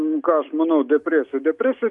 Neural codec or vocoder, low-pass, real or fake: none; 10.8 kHz; real